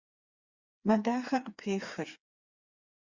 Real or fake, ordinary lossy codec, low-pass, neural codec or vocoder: fake; Opus, 64 kbps; 7.2 kHz; codec, 16 kHz, 4 kbps, FreqCodec, smaller model